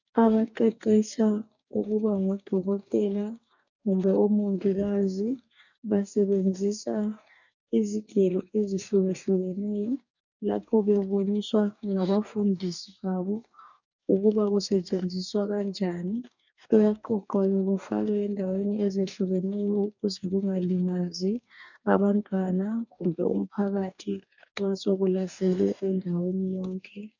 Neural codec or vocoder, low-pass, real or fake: codec, 44.1 kHz, 2.6 kbps, DAC; 7.2 kHz; fake